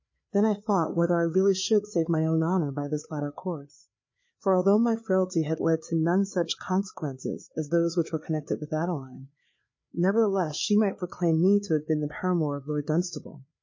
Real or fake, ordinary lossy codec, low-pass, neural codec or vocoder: fake; MP3, 32 kbps; 7.2 kHz; codec, 16 kHz, 4 kbps, FreqCodec, larger model